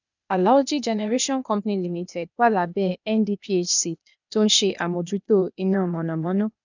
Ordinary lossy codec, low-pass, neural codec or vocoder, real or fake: none; 7.2 kHz; codec, 16 kHz, 0.8 kbps, ZipCodec; fake